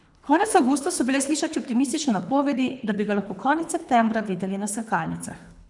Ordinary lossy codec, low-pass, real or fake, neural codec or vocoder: none; 10.8 kHz; fake; codec, 24 kHz, 3 kbps, HILCodec